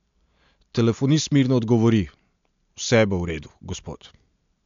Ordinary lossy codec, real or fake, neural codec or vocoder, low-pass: MP3, 64 kbps; real; none; 7.2 kHz